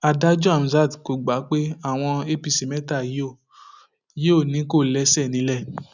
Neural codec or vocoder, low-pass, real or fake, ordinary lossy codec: none; 7.2 kHz; real; none